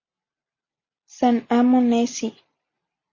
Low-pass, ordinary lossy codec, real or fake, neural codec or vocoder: 7.2 kHz; MP3, 32 kbps; real; none